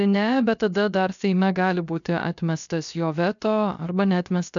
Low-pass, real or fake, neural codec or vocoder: 7.2 kHz; fake; codec, 16 kHz, 0.7 kbps, FocalCodec